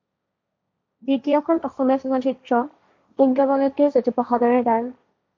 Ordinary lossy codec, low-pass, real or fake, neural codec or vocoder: MP3, 48 kbps; 7.2 kHz; fake; codec, 16 kHz, 1.1 kbps, Voila-Tokenizer